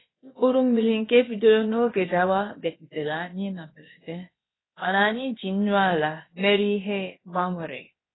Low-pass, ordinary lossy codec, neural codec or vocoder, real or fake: 7.2 kHz; AAC, 16 kbps; codec, 16 kHz, about 1 kbps, DyCAST, with the encoder's durations; fake